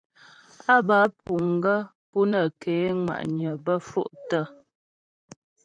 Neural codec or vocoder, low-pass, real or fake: vocoder, 44.1 kHz, 128 mel bands, Pupu-Vocoder; 9.9 kHz; fake